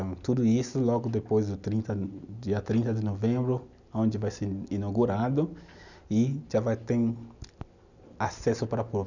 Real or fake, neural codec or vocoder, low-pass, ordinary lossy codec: real; none; 7.2 kHz; none